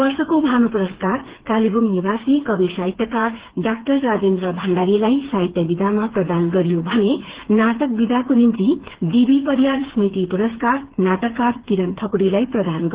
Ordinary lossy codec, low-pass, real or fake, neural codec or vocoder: Opus, 16 kbps; 3.6 kHz; fake; codec, 16 kHz, 4 kbps, FreqCodec, smaller model